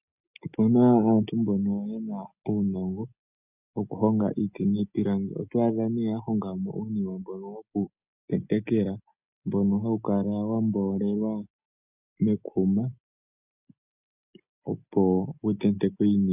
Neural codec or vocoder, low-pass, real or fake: none; 3.6 kHz; real